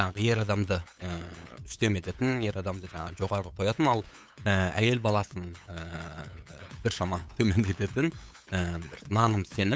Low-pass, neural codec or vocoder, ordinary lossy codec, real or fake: none; codec, 16 kHz, 8 kbps, FunCodec, trained on LibriTTS, 25 frames a second; none; fake